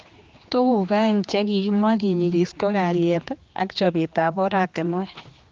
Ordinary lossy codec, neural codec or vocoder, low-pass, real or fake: Opus, 24 kbps; codec, 16 kHz, 2 kbps, X-Codec, HuBERT features, trained on general audio; 7.2 kHz; fake